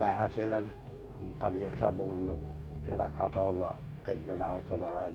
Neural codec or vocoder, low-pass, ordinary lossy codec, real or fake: codec, 44.1 kHz, 2.6 kbps, DAC; 19.8 kHz; none; fake